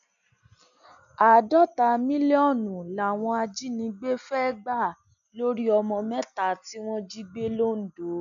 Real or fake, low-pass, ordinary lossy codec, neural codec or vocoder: real; 7.2 kHz; none; none